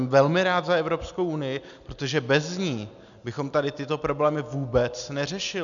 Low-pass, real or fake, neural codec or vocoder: 7.2 kHz; real; none